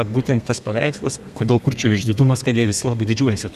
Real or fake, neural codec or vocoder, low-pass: fake; codec, 32 kHz, 1.9 kbps, SNAC; 14.4 kHz